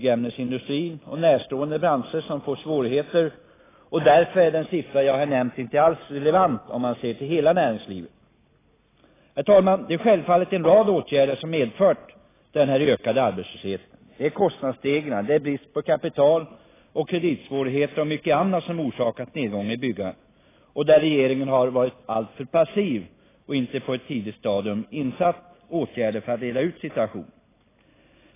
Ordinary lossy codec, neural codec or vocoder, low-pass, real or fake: AAC, 16 kbps; none; 3.6 kHz; real